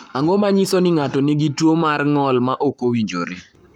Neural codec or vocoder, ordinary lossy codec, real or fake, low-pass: codec, 44.1 kHz, 7.8 kbps, Pupu-Codec; none; fake; 19.8 kHz